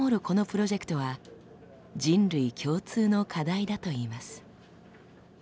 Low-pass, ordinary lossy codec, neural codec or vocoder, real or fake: none; none; none; real